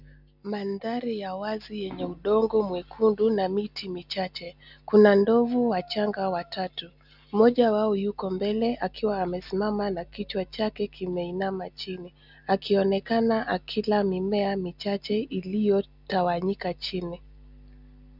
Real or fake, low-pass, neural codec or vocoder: real; 5.4 kHz; none